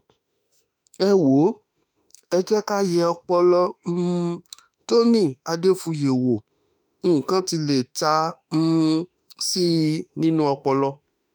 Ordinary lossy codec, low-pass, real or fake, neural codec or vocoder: none; none; fake; autoencoder, 48 kHz, 32 numbers a frame, DAC-VAE, trained on Japanese speech